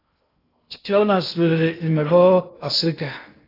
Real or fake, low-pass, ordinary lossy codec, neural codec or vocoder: fake; 5.4 kHz; AAC, 32 kbps; codec, 16 kHz in and 24 kHz out, 0.6 kbps, FocalCodec, streaming, 2048 codes